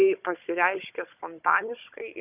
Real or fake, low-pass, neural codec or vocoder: fake; 3.6 kHz; codec, 16 kHz, 16 kbps, FunCodec, trained on Chinese and English, 50 frames a second